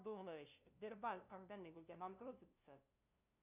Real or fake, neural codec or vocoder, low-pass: fake; codec, 16 kHz, 0.5 kbps, FunCodec, trained on LibriTTS, 25 frames a second; 3.6 kHz